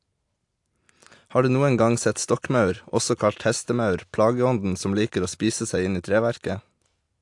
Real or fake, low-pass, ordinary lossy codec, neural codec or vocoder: real; 10.8 kHz; AAC, 64 kbps; none